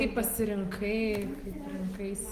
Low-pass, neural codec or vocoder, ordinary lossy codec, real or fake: 14.4 kHz; none; Opus, 24 kbps; real